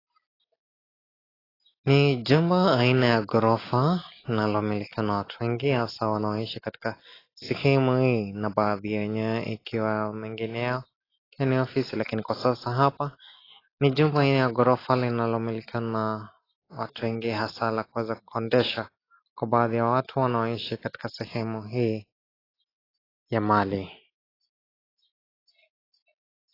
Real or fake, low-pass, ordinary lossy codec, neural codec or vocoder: real; 5.4 kHz; AAC, 24 kbps; none